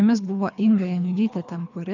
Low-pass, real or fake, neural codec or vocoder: 7.2 kHz; fake; codec, 24 kHz, 3 kbps, HILCodec